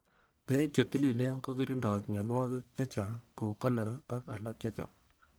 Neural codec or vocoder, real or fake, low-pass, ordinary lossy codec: codec, 44.1 kHz, 1.7 kbps, Pupu-Codec; fake; none; none